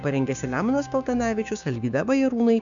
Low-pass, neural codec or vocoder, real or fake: 7.2 kHz; codec, 16 kHz, 6 kbps, DAC; fake